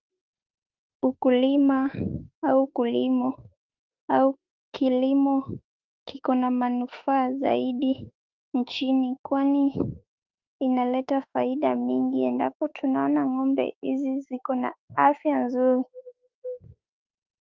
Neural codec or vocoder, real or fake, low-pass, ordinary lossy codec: autoencoder, 48 kHz, 32 numbers a frame, DAC-VAE, trained on Japanese speech; fake; 7.2 kHz; Opus, 24 kbps